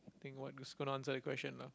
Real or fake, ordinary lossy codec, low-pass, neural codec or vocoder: real; none; none; none